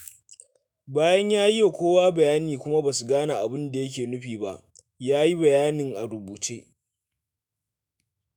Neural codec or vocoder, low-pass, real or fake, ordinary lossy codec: autoencoder, 48 kHz, 128 numbers a frame, DAC-VAE, trained on Japanese speech; none; fake; none